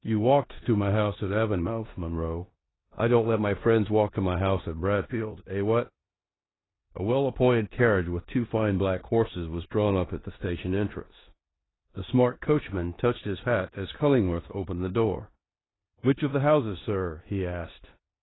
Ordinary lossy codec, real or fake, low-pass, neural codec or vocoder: AAC, 16 kbps; fake; 7.2 kHz; codec, 16 kHz in and 24 kHz out, 0.9 kbps, LongCat-Audio-Codec, four codebook decoder